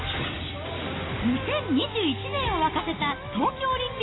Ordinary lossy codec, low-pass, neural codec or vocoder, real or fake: AAC, 16 kbps; 7.2 kHz; none; real